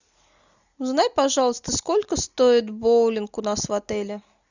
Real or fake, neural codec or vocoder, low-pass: real; none; 7.2 kHz